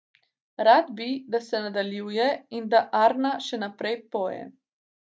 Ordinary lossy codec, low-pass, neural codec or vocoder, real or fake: none; none; none; real